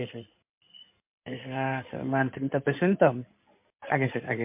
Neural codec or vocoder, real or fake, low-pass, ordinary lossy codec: codec, 16 kHz in and 24 kHz out, 2.2 kbps, FireRedTTS-2 codec; fake; 3.6 kHz; MP3, 32 kbps